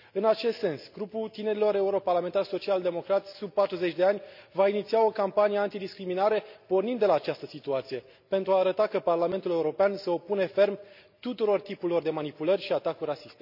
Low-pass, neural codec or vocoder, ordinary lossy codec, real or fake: 5.4 kHz; none; none; real